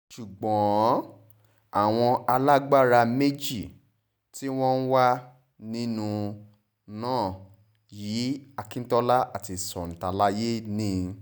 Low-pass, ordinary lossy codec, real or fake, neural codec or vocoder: none; none; real; none